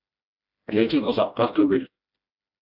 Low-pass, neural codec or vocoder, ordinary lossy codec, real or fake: 5.4 kHz; codec, 16 kHz, 1 kbps, FreqCodec, smaller model; AAC, 48 kbps; fake